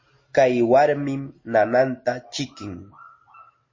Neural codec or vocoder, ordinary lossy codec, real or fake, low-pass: none; MP3, 32 kbps; real; 7.2 kHz